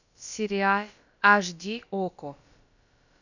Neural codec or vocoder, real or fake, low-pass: codec, 16 kHz, about 1 kbps, DyCAST, with the encoder's durations; fake; 7.2 kHz